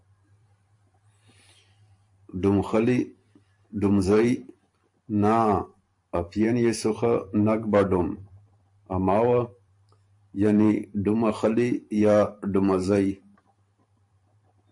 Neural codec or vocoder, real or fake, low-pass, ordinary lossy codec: vocoder, 44.1 kHz, 128 mel bands every 512 samples, BigVGAN v2; fake; 10.8 kHz; AAC, 64 kbps